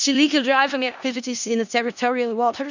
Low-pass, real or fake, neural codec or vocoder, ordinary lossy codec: 7.2 kHz; fake; codec, 16 kHz in and 24 kHz out, 0.4 kbps, LongCat-Audio-Codec, four codebook decoder; none